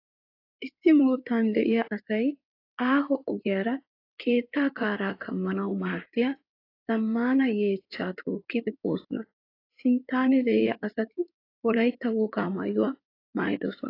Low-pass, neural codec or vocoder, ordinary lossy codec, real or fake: 5.4 kHz; codec, 16 kHz in and 24 kHz out, 2.2 kbps, FireRedTTS-2 codec; AAC, 32 kbps; fake